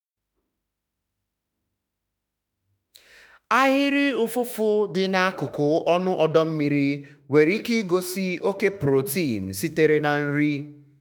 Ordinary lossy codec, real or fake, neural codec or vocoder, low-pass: none; fake; autoencoder, 48 kHz, 32 numbers a frame, DAC-VAE, trained on Japanese speech; none